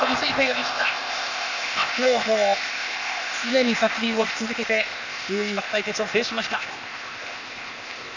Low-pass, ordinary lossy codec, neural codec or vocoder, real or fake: 7.2 kHz; none; codec, 16 kHz, 0.8 kbps, ZipCodec; fake